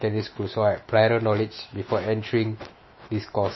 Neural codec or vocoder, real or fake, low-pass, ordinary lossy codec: autoencoder, 48 kHz, 128 numbers a frame, DAC-VAE, trained on Japanese speech; fake; 7.2 kHz; MP3, 24 kbps